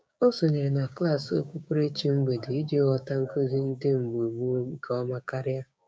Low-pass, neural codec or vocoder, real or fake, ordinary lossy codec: none; codec, 16 kHz, 6 kbps, DAC; fake; none